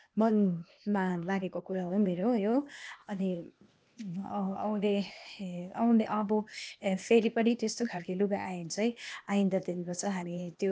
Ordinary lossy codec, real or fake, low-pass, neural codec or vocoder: none; fake; none; codec, 16 kHz, 0.8 kbps, ZipCodec